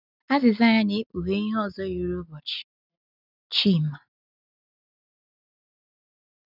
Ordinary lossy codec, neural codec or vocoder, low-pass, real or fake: none; none; 5.4 kHz; real